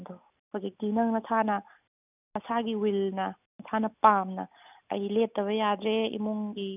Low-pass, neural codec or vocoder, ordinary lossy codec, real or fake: 3.6 kHz; none; none; real